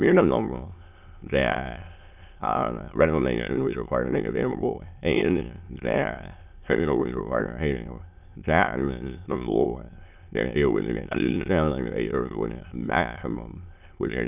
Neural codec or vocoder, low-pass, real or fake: autoencoder, 22.05 kHz, a latent of 192 numbers a frame, VITS, trained on many speakers; 3.6 kHz; fake